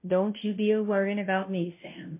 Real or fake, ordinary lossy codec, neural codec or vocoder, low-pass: fake; MP3, 24 kbps; codec, 16 kHz, 0.5 kbps, FunCodec, trained on Chinese and English, 25 frames a second; 3.6 kHz